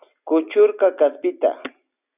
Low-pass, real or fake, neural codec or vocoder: 3.6 kHz; real; none